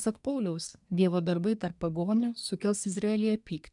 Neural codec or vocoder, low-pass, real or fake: codec, 24 kHz, 1 kbps, SNAC; 10.8 kHz; fake